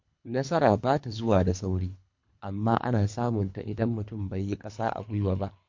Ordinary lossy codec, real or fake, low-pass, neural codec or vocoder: MP3, 48 kbps; fake; 7.2 kHz; codec, 24 kHz, 3 kbps, HILCodec